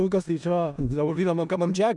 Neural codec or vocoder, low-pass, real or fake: codec, 16 kHz in and 24 kHz out, 0.4 kbps, LongCat-Audio-Codec, four codebook decoder; 10.8 kHz; fake